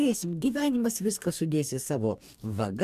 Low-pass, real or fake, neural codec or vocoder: 14.4 kHz; fake; codec, 44.1 kHz, 2.6 kbps, DAC